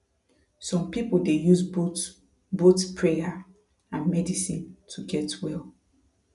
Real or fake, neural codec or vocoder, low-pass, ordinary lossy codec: real; none; 10.8 kHz; none